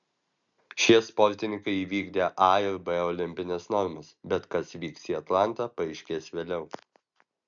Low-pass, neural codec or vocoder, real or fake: 7.2 kHz; none; real